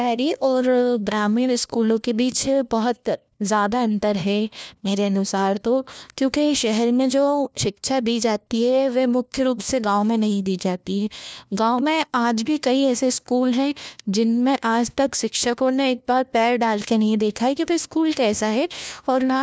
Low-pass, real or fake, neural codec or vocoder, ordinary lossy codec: none; fake; codec, 16 kHz, 1 kbps, FunCodec, trained on LibriTTS, 50 frames a second; none